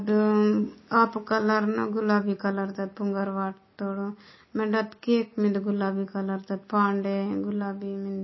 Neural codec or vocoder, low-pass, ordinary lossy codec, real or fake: none; 7.2 kHz; MP3, 24 kbps; real